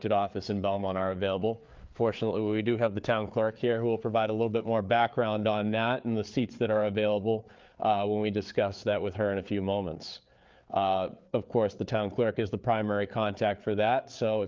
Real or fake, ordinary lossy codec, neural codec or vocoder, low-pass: fake; Opus, 24 kbps; codec, 16 kHz, 4 kbps, FreqCodec, larger model; 7.2 kHz